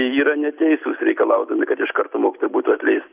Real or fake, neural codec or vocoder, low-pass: real; none; 3.6 kHz